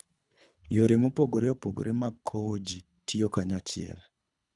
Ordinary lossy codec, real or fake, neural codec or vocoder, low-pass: none; fake; codec, 24 kHz, 3 kbps, HILCodec; 10.8 kHz